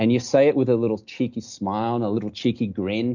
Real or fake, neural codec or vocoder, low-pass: real; none; 7.2 kHz